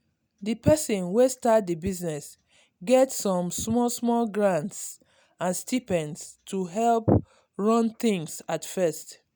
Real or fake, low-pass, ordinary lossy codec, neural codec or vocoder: real; none; none; none